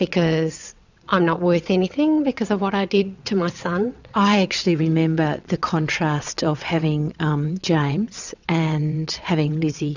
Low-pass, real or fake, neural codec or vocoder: 7.2 kHz; fake; vocoder, 22.05 kHz, 80 mel bands, WaveNeXt